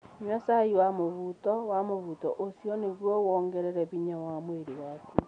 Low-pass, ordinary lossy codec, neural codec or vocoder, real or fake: 9.9 kHz; none; none; real